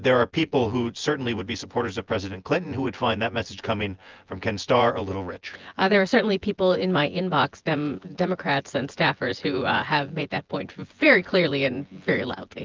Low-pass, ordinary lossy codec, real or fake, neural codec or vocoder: 7.2 kHz; Opus, 24 kbps; fake; vocoder, 24 kHz, 100 mel bands, Vocos